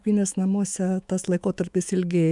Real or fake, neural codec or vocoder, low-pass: fake; autoencoder, 48 kHz, 128 numbers a frame, DAC-VAE, trained on Japanese speech; 10.8 kHz